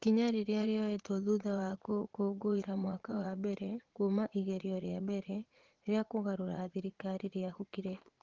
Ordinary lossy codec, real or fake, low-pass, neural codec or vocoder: Opus, 16 kbps; fake; 7.2 kHz; vocoder, 44.1 kHz, 80 mel bands, Vocos